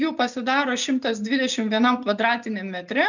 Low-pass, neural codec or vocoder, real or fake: 7.2 kHz; none; real